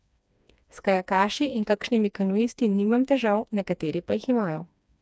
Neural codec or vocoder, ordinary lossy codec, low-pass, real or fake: codec, 16 kHz, 2 kbps, FreqCodec, smaller model; none; none; fake